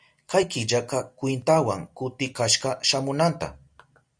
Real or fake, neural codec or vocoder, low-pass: real; none; 9.9 kHz